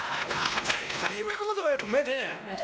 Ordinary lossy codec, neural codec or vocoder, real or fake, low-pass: none; codec, 16 kHz, 1 kbps, X-Codec, WavLM features, trained on Multilingual LibriSpeech; fake; none